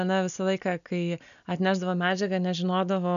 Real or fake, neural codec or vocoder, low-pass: real; none; 7.2 kHz